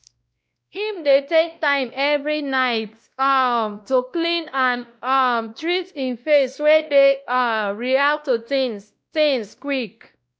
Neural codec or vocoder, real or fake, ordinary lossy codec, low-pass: codec, 16 kHz, 1 kbps, X-Codec, WavLM features, trained on Multilingual LibriSpeech; fake; none; none